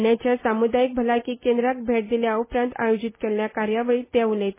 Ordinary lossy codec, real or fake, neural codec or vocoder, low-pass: MP3, 16 kbps; real; none; 3.6 kHz